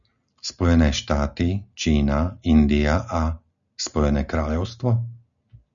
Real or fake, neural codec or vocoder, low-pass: real; none; 7.2 kHz